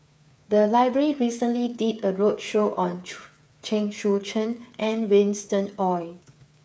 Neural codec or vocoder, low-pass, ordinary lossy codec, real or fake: codec, 16 kHz, 8 kbps, FreqCodec, smaller model; none; none; fake